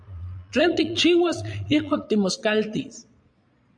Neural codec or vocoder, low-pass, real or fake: vocoder, 22.05 kHz, 80 mel bands, Vocos; 9.9 kHz; fake